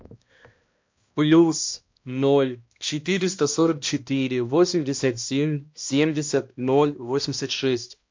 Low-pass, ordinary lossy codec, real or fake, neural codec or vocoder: 7.2 kHz; MP3, 48 kbps; fake; codec, 16 kHz, 1 kbps, X-Codec, HuBERT features, trained on balanced general audio